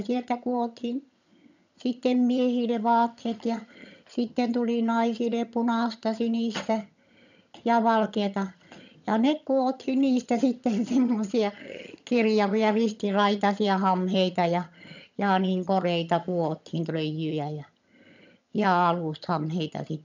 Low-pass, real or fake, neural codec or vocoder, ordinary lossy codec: 7.2 kHz; fake; vocoder, 22.05 kHz, 80 mel bands, HiFi-GAN; none